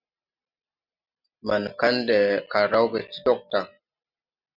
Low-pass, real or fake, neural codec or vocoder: 5.4 kHz; real; none